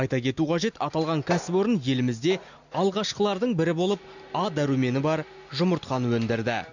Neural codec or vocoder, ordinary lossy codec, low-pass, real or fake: none; none; 7.2 kHz; real